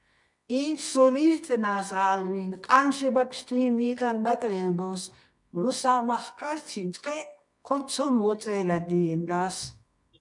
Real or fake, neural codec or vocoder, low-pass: fake; codec, 24 kHz, 0.9 kbps, WavTokenizer, medium music audio release; 10.8 kHz